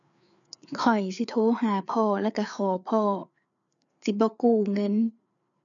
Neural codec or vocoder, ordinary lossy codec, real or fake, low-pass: codec, 16 kHz, 4 kbps, FreqCodec, larger model; none; fake; 7.2 kHz